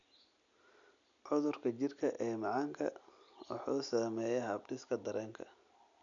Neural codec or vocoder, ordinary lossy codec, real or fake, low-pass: none; none; real; 7.2 kHz